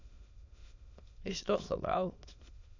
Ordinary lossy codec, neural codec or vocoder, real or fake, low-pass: none; autoencoder, 22.05 kHz, a latent of 192 numbers a frame, VITS, trained on many speakers; fake; 7.2 kHz